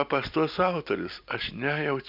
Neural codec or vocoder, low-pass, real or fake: none; 5.4 kHz; real